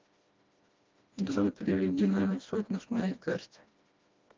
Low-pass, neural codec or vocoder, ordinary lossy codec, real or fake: 7.2 kHz; codec, 16 kHz, 1 kbps, FreqCodec, smaller model; Opus, 24 kbps; fake